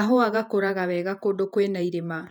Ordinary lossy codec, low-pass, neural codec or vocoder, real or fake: none; 19.8 kHz; vocoder, 48 kHz, 128 mel bands, Vocos; fake